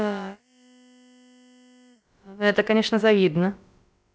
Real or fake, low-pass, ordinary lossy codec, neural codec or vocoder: fake; none; none; codec, 16 kHz, about 1 kbps, DyCAST, with the encoder's durations